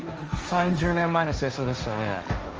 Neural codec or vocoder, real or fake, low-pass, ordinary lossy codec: codec, 16 kHz, 1.1 kbps, Voila-Tokenizer; fake; 7.2 kHz; Opus, 24 kbps